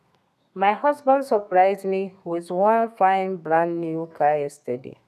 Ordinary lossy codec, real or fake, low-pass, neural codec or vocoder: none; fake; 14.4 kHz; codec, 32 kHz, 1.9 kbps, SNAC